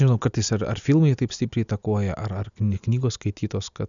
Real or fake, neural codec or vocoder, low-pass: real; none; 7.2 kHz